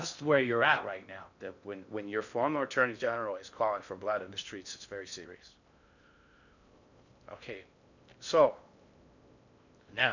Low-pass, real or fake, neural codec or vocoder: 7.2 kHz; fake; codec, 16 kHz in and 24 kHz out, 0.6 kbps, FocalCodec, streaming, 2048 codes